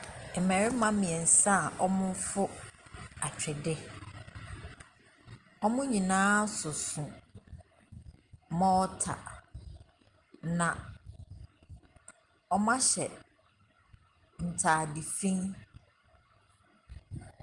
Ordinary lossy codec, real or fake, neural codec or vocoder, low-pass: Opus, 24 kbps; real; none; 10.8 kHz